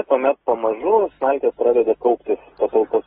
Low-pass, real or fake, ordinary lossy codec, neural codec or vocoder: 7.2 kHz; real; AAC, 16 kbps; none